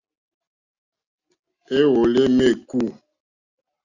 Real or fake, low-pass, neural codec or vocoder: real; 7.2 kHz; none